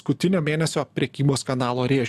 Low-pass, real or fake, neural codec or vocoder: 14.4 kHz; real; none